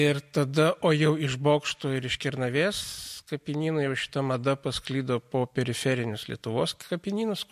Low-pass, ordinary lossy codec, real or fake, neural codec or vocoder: 14.4 kHz; MP3, 64 kbps; real; none